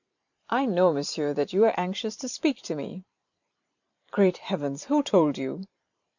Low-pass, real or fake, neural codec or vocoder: 7.2 kHz; fake; vocoder, 44.1 kHz, 128 mel bands every 512 samples, BigVGAN v2